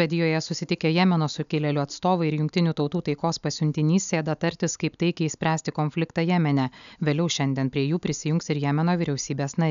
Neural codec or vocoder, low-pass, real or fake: none; 7.2 kHz; real